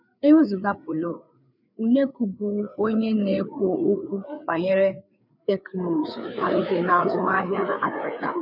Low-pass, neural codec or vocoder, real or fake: 5.4 kHz; codec, 16 kHz, 4 kbps, FreqCodec, larger model; fake